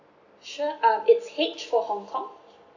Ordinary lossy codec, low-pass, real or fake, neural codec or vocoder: none; 7.2 kHz; real; none